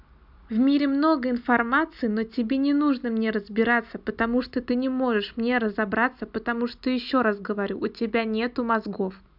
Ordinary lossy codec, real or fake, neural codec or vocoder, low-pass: none; real; none; 5.4 kHz